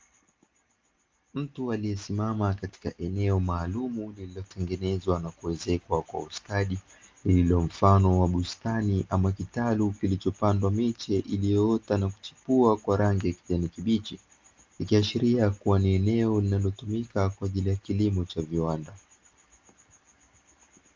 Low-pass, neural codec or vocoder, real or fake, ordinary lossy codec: 7.2 kHz; none; real; Opus, 24 kbps